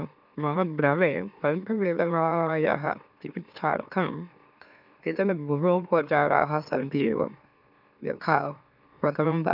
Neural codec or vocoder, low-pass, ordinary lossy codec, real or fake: autoencoder, 44.1 kHz, a latent of 192 numbers a frame, MeloTTS; 5.4 kHz; none; fake